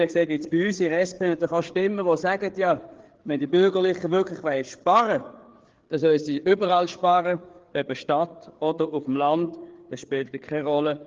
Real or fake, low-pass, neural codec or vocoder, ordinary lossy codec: fake; 7.2 kHz; codec, 16 kHz, 4 kbps, FreqCodec, larger model; Opus, 16 kbps